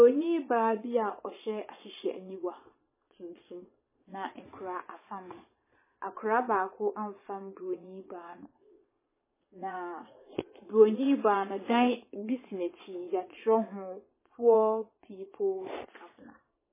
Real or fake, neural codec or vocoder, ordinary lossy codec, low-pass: fake; vocoder, 22.05 kHz, 80 mel bands, Vocos; MP3, 16 kbps; 3.6 kHz